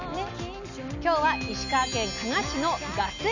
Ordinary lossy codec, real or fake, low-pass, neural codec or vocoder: none; real; 7.2 kHz; none